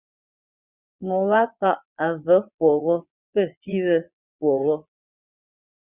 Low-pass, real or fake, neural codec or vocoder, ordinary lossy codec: 3.6 kHz; fake; vocoder, 22.05 kHz, 80 mel bands, Vocos; Opus, 64 kbps